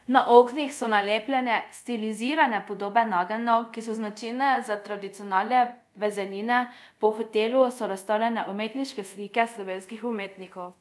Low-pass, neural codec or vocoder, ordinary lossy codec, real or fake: none; codec, 24 kHz, 0.5 kbps, DualCodec; none; fake